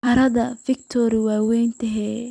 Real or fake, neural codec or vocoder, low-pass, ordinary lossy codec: fake; vocoder, 44.1 kHz, 128 mel bands every 256 samples, BigVGAN v2; 9.9 kHz; none